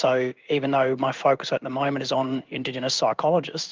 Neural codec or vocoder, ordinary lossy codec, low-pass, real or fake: codec, 16 kHz in and 24 kHz out, 1 kbps, XY-Tokenizer; Opus, 24 kbps; 7.2 kHz; fake